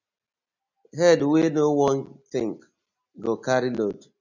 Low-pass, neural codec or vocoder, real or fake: 7.2 kHz; none; real